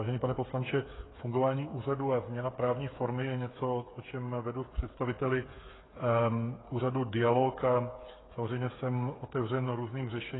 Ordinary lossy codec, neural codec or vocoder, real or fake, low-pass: AAC, 16 kbps; codec, 16 kHz, 8 kbps, FreqCodec, smaller model; fake; 7.2 kHz